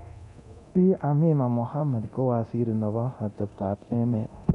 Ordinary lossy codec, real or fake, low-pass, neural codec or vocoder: none; fake; 10.8 kHz; codec, 24 kHz, 0.9 kbps, DualCodec